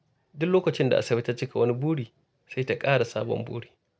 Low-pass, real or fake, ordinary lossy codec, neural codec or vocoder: none; real; none; none